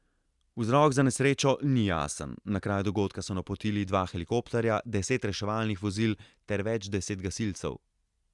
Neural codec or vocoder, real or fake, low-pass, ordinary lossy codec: none; real; 10.8 kHz; Opus, 64 kbps